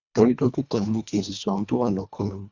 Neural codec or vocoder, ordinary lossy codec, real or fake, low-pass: codec, 24 kHz, 1.5 kbps, HILCodec; Opus, 64 kbps; fake; 7.2 kHz